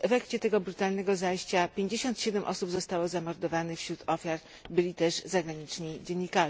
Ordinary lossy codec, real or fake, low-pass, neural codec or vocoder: none; real; none; none